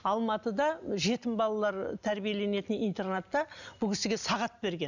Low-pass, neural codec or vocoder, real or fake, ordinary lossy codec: 7.2 kHz; none; real; none